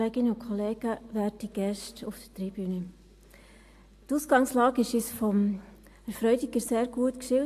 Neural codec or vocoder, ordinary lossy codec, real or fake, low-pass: none; AAC, 64 kbps; real; 14.4 kHz